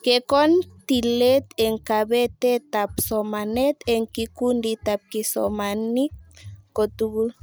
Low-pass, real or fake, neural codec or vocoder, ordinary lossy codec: none; real; none; none